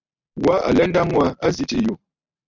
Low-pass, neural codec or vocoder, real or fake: 7.2 kHz; none; real